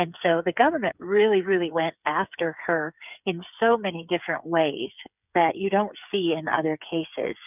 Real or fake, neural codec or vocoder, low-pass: fake; codec, 16 kHz, 8 kbps, FreqCodec, smaller model; 3.6 kHz